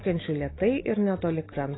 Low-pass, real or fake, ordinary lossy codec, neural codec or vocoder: 7.2 kHz; real; AAC, 16 kbps; none